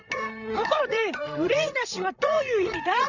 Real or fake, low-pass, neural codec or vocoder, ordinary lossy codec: fake; 7.2 kHz; codec, 16 kHz, 8 kbps, FreqCodec, larger model; none